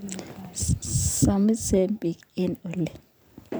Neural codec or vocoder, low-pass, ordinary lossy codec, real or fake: vocoder, 44.1 kHz, 128 mel bands every 512 samples, BigVGAN v2; none; none; fake